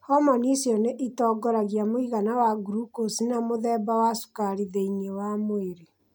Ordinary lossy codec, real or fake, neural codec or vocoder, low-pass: none; real; none; none